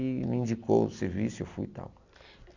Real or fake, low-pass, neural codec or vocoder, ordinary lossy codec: real; 7.2 kHz; none; none